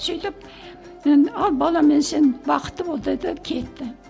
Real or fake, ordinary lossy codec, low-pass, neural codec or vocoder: real; none; none; none